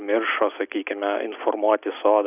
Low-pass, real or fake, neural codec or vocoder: 3.6 kHz; real; none